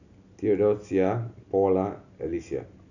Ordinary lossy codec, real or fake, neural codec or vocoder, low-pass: none; real; none; 7.2 kHz